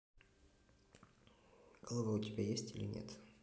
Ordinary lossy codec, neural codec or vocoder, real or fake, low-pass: none; none; real; none